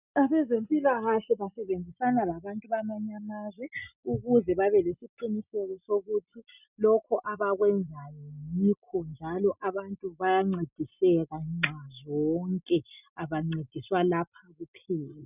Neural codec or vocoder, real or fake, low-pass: none; real; 3.6 kHz